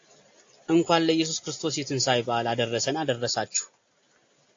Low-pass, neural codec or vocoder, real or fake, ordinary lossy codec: 7.2 kHz; none; real; AAC, 48 kbps